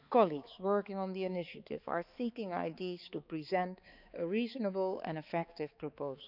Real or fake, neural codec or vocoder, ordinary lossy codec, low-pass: fake; codec, 16 kHz, 4 kbps, X-Codec, HuBERT features, trained on balanced general audio; none; 5.4 kHz